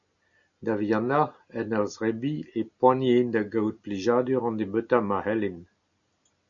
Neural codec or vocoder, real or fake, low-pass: none; real; 7.2 kHz